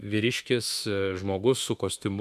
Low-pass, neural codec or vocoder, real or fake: 14.4 kHz; autoencoder, 48 kHz, 32 numbers a frame, DAC-VAE, trained on Japanese speech; fake